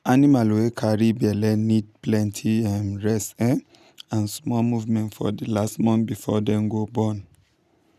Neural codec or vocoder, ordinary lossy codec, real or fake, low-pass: none; none; real; 14.4 kHz